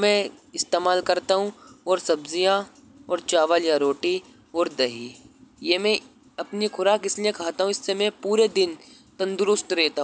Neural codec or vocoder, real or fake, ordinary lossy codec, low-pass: none; real; none; none